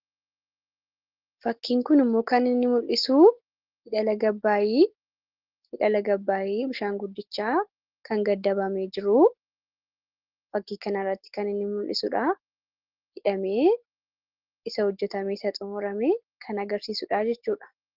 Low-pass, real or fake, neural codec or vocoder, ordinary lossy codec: 5.4 kHz; real; none; Opus, 16 kbps